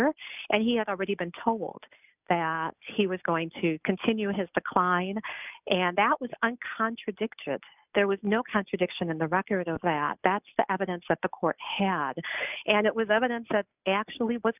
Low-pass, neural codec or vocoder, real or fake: 3.6 kHz; none; real